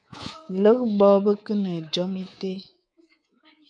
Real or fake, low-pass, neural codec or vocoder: fake; 9.9 kHz; codec, 24 kHz, 3.1 kbps, DualCodec